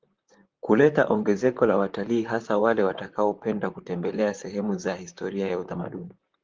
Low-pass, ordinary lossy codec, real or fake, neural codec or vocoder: 7.2 kHz; Opus, 32 kbps; fake; vocoder, 44.1 kHz, 128 mel bands, Pupu-Vocoder